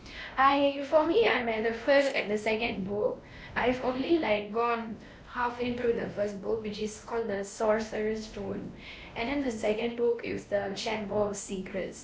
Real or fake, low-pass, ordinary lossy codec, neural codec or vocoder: fake; none; none; codec, 16 kHz, 1 kbps, X-Codec, WavLM features, trained on Multilingual LibriSpeech